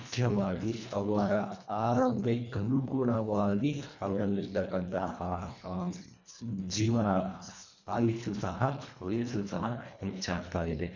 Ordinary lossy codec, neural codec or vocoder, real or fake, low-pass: none; codec, 24 kHz, 1.5 kbps, HILCodec; fake; 7.2 kHz